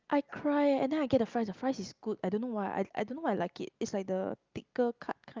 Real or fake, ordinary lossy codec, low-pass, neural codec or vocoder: real; Opus, 24 kbps; 7.2 kHz; none